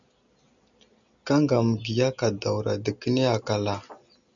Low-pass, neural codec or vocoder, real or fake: 7.2 kHz; none; real